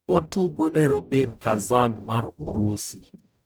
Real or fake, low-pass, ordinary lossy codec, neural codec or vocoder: fake; none; none; codec, 44.1 kHz, 0.9 kbps, DAC